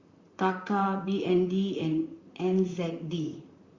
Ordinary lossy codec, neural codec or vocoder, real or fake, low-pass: Opus, 64 kbps; vocoder, 44.1 kHz, 128 mel bands, Pupu-Vocoder; fake; 7.2 kHz